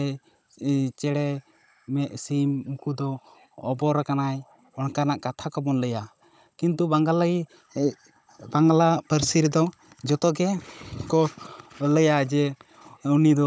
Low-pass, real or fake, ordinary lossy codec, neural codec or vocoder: none; fake; none; codec, 16 kHz, 16 kbps, FunCodec, trained on Chinese and English, 50 frames a second